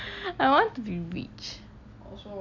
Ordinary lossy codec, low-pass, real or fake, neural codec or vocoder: none; 7.2 kHz; real; none